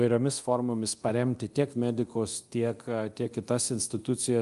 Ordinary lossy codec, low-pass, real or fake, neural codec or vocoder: Opus, 32 kbps; 10.8 kHz; fake; codec, 24 kHz, 0.9 kbps, DualCodec